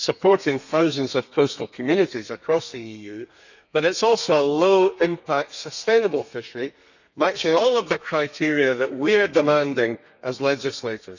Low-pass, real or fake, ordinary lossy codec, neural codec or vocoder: 7.2 kHz; fake; none; codec, 32 kHz, 1.9 kbps, SNAC